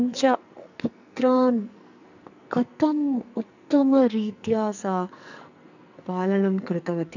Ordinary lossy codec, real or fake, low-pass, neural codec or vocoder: none; fake; 7.2 kHz; codec, 44.1 kHz, 2.6 kbps, SNAC